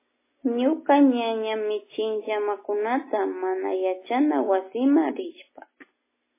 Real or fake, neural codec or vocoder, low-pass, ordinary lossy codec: real; none; 3.6 kHz; MP3, 16 kbps